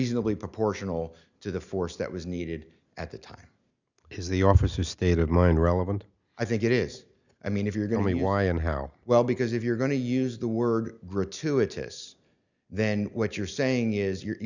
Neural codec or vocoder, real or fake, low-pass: none; real; 7.2 kHz